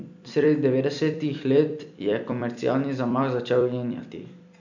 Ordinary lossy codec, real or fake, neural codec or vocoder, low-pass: none; fake; vocoder, 44.1 kHz, 128 mel bands every 256 samples, BigVGAN v2; 7.2 kHz